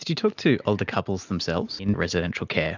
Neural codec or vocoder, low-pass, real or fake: none; 7.2 kHz; real